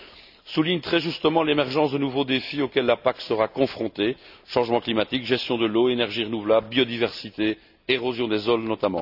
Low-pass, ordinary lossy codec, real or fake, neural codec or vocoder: 5.4 kHz; none; real; none